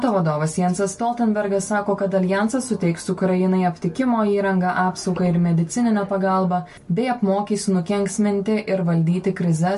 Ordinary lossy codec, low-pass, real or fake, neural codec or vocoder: MP3, 48 kbps; 14.4 kHz; real; none